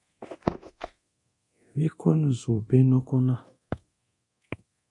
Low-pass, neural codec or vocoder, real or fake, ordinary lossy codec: 10.8 kHz; codec, 24 kHz, 0.9 kbps, DualCodec; fake; MP3, 48 kbps